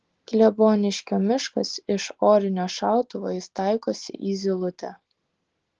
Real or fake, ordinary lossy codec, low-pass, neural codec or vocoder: real; Opus, 16 kbps; 7.2 kHz; none